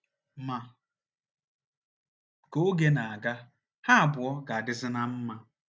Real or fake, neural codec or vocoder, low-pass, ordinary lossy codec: real; none; none; none